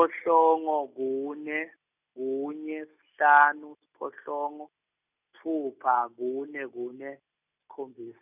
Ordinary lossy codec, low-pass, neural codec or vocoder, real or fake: none; 3.6 kHz; none; real